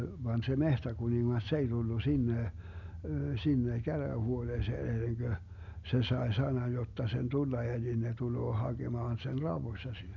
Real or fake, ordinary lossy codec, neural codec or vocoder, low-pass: real; none; none; 7.2 kHz